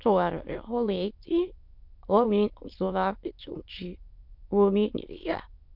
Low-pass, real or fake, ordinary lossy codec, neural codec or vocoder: 5.4 kHz; fake; MP3, 48 kbps; autoencoder, 22.05 kHz, a latent of 192 numbers a frame, VITS, trained on many speakers